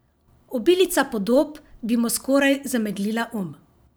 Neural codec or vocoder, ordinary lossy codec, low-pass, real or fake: vocoder, 44.1 kHz, 128 mel bands every 512 samples, BigVGAN v2; none; none; fake